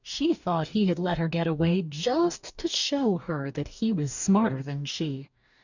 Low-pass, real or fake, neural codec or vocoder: 7.2 kHz; fake; codec, 44.1 kHz, 2.6 kbps, DAC